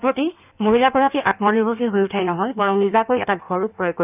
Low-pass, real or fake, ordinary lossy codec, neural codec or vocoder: 3.6 kHz; fake; none; codec, 16 kHz in and 24 kHz out, 1.1 kbps, FireRedTTS-2 codec